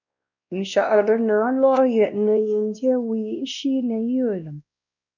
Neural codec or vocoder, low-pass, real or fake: codec, 16 kHz, 1 kbps, X-Codec, WavLM features, trained on Multilingual LibriSpeech; 7.2 kHz; fake